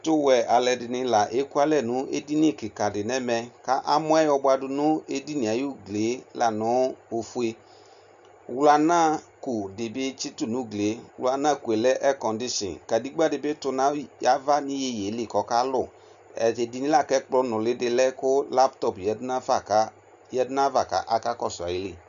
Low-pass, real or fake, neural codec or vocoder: 7.2 kHz; real; none